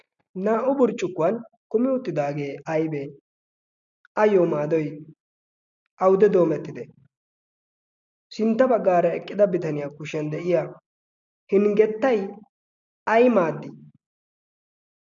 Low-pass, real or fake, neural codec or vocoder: 7.2 kHz; real; none